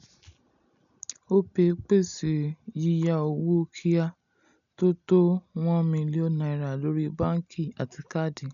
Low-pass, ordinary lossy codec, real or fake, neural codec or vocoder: 7.2 kHz; none; real; none